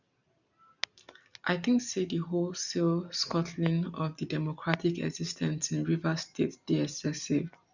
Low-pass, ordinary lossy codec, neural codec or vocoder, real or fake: 7.2 kHz; none; none; real